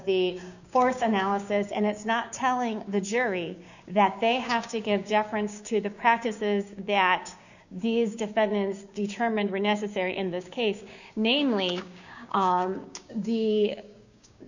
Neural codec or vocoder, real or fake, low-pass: codec, 16 kHz, 6 kbps, DAC; fake; 7.2 kHz